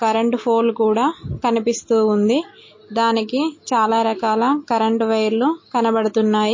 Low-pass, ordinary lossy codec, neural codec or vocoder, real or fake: 7.2 kHz; MP3, 32 kbps; none; real